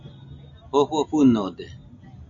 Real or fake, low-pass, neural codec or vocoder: real; 7.2 kHz; none